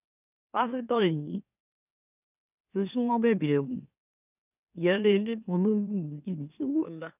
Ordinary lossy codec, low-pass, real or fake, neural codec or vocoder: none; 3.6 kHz; fake; autoencoder, 44.1 kHz, a latent of 192 numbers a frame, MeloTTS